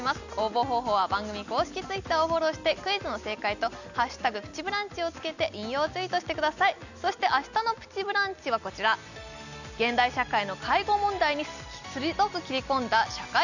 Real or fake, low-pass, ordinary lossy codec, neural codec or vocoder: real; 7.2 kHz; none; none